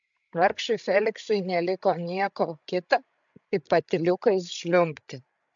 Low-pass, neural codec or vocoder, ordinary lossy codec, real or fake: 7.2 kHz; codec, 16 kHz, 16 kbps, FreqCodec, larger model; MP3, 64 kbps; fake